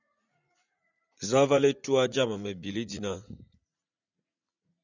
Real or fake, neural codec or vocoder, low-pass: fake; vocoder, 44.1 kHz, 80 mel bands, Vocos; 7.2 kHz